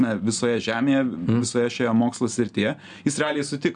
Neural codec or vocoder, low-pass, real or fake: none; 9.9 kHz; real